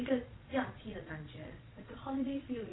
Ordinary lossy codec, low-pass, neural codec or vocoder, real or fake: AAC, 16 kbps; 7.2 kHz; vocoder, 22.05 kHz, 80 mel bands, Vocos; fake